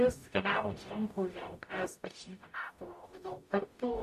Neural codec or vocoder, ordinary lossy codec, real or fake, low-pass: codec, 44.1 kHz, 0.9 kbps, DAC; MP3, 64 kbps; fake; 14.4 kHz